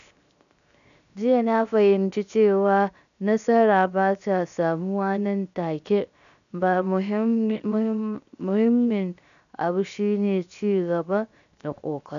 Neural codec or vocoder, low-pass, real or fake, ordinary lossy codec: codec, 16 kHz, 0.7 kbps, FocalCodec; 7.2 kHz; fake; none